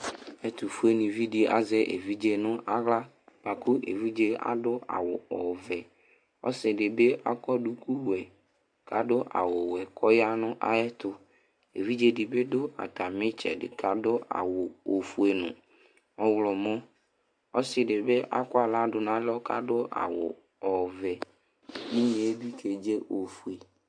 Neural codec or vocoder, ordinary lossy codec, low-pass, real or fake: none; MP3, 64 kbps; 9.9 kHz; real